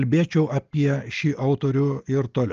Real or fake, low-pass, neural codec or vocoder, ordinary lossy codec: real; 7.2 kHz; none; Opus, 24 kbps